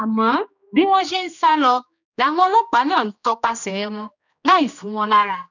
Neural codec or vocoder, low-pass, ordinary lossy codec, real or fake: codec, 16 kHz, 1 kbps, X-Codec, HuBERT features, trained on general audio; 7.2 kHz; none; fake